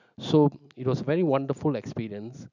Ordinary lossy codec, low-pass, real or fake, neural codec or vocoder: none; 7.2 kHz; real; none